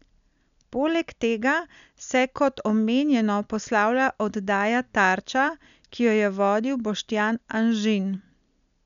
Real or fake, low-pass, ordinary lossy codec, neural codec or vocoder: real; 7.2 kHz; none; none